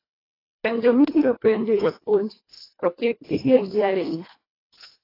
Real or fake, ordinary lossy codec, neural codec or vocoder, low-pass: fake; AAC, 24 kbps; codec, 24 kHz, 1.5 kbps, HILCodec; 5.4 kHz